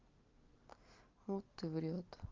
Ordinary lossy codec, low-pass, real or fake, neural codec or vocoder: Opus, 24 kbps; 7.2 kHz; real; none